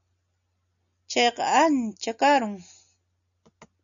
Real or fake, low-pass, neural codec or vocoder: real; 7.2 kHz; none